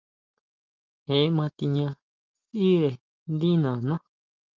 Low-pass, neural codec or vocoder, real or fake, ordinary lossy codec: 7.2 kHz; none; real; Opus, 32 kbps